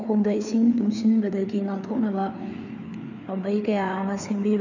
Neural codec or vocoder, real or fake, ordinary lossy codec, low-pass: codec, 16 kHz, 4 kbps, FreqCodec, larger model; fake; none; 7.2 kHz